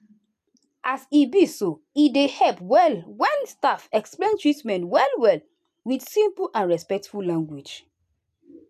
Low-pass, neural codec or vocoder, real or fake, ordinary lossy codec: 14.4 kHz; none; real; none